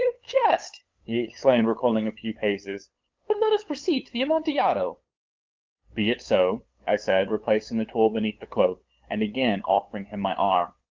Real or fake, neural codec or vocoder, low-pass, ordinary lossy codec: fake; codec, 16 kHz, 8 kbps, FunCodec, trained on LibriTTS, 25 frames a second; 7.2 kHz; Opus, 32 kbps